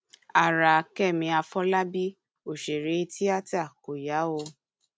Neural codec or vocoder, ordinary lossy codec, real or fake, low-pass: none; none; real; none